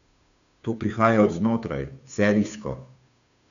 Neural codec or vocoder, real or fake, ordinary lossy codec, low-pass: codec, 16 kHz, 2 kbps, FunCodec, trained on Chinese and English, 25 frames a second; fake; none; 7.2 kHz